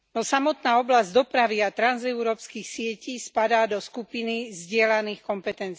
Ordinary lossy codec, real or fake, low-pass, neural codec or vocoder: none; real; none; none